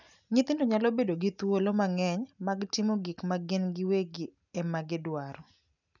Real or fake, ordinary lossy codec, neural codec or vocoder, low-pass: real; none; none; 7.2 kHz